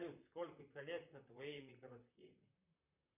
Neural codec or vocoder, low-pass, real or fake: vocoder, 44.1 kHz, 128 mel bands, Pupu-Vocoder; 3.6 kHz; fake